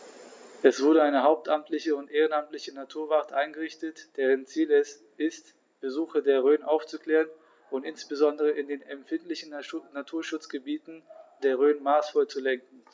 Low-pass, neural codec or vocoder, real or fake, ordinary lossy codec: none; none; real; none